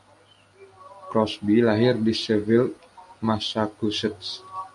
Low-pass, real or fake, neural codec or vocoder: 10.8 kHz; real; none